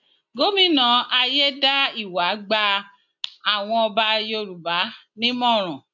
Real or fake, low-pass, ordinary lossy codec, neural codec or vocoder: real; 7.2 kHz; none; none